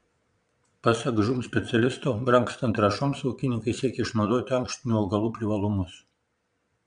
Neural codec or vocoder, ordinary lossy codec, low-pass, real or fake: vocoder, 22.05 kHz, 80 mel bands, Vocos; MP3, 64 kbps; 9.9 kHz; fake